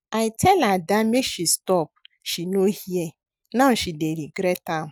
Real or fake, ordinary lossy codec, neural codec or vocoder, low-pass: real; none; none; none